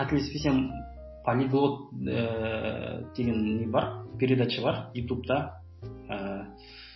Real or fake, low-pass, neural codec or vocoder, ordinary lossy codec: real; 7.2 kHz; none; MP3, 24 kbps